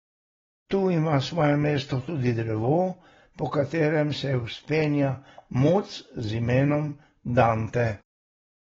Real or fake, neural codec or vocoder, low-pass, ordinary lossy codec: real; none; 7.2 kHz; AAC, 24 kbps